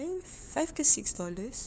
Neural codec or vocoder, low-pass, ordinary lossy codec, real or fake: codec, 16 kHz, 4 kbps, FunCodec, trained on LibriTTS, 50 frames a second; none; none; fake